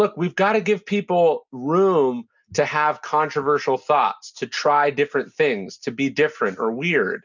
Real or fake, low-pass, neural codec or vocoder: real; 7.2 kHz; none